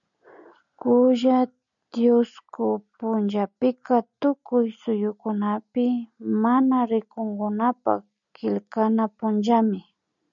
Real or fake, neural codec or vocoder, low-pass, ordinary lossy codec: real; none; 7.2 kHz; MP3, 96 kbps